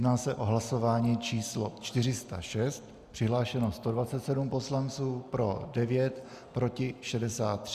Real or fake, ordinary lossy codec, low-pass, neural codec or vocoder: real; Opus, 64 kbps; 14.4 kHz; none